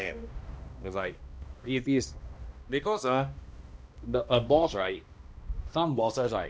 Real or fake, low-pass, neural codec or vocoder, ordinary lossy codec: fake; none; codec, 16 kHz, 1 kbps, X-Codec, HuBERT features, trained on balanced general audio; none